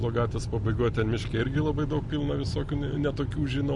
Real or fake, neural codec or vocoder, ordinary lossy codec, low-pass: real; none; Opus, 64 kbps; 10.8 kHz